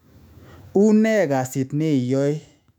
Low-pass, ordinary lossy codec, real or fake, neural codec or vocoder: 19.8 kHz; none; fake; autoencoder, 48 kHz, 128 numbers a frame, DAC-VAE, trained on Japanese speech